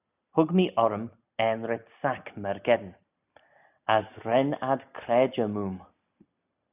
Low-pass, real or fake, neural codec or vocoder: 3.6 kHz; real; none